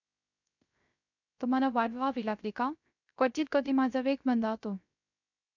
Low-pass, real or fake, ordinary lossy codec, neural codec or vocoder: 7.2 kHz; fake; none; codec, 16 kHz, 0.3 kbps, FocalCodec